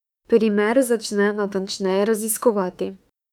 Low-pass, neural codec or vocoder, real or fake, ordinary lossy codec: 19.8 kHz; autoencoder, 48 kHz, 32 numbers a frame, DAC-VAE, trained on Japanese speech; fake; none